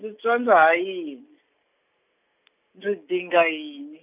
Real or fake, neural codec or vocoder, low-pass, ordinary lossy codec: real; none; 3.6 kHz; none